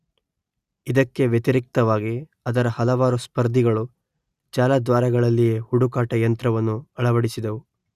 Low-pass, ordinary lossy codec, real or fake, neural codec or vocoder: 14.4 kHz; Opus, 64 kbps; real; none